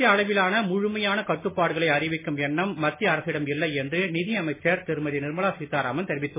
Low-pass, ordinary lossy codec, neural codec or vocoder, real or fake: 3.6 kHz; MP3, 16 kbps; none; real